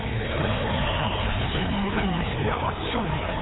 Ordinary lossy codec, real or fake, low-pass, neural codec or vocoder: AAC, 16 kbps; fake; 7.2 kHz; codec, 16 kHz, 2 kbps, FreqCodec, larger model